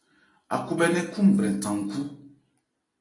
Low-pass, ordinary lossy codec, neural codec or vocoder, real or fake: 10.8 kHz; AAC, 32 kbps; none; real